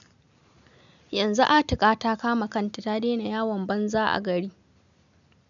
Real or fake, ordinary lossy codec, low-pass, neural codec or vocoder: real; none; 7.2 kHz; none